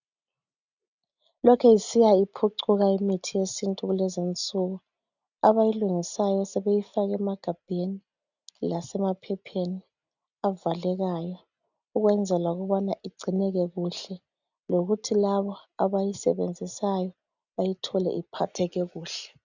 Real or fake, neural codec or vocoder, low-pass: real; none; 7.2 kHz